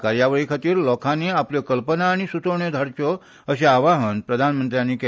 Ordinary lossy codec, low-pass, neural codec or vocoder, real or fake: none; none; none; real